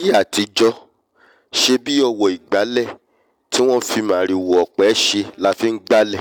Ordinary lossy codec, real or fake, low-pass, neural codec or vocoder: none; real; 19.8 kHz; none